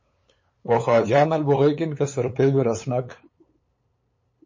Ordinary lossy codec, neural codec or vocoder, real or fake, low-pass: MP3, 32 kbps; codec, 16 kHz, 8 kbps, FunCodec, trained on LibriTTS, 25 frames a second; fake; 7.2 kHz